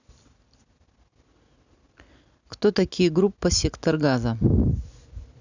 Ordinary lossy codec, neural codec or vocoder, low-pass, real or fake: none; none; 7.2 kHz; real